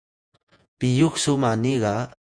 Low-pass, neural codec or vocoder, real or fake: 9.9 kHz; vocoder, 48 kHz, 128 mel bands, Vocos; fake